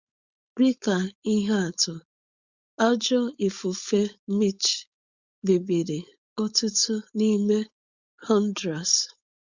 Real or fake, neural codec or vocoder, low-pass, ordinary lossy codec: fake; codec, 16 kHz, 4.8 kbps, FACodec; 7.2 kHz; Opus, 64 kbps